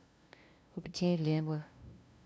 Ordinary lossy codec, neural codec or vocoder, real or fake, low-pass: none; codec, 16 kHz, 0.5 kbps, FunCodec, trained on LibriTTS, 25 frames a second; fake; none